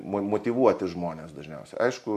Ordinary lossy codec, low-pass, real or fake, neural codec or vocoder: MP3, 64 kbps; 14.4 kHz; real; none